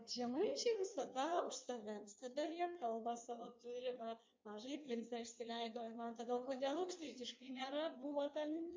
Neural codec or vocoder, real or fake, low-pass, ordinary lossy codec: codec, 16 kHz in and 24 kHz out, 1.1 kbps, FireRedTTS-2 codec; fake; 7.2 kHz; MP3, 64 kbps